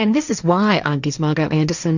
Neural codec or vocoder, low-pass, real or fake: codec, 16 kHz, 1.1 kbps, Voila-Tokenizer; 7.2 kHz; fake